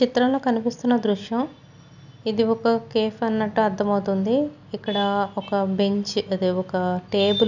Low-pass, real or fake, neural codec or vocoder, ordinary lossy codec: 7.2 kHz; real; none; none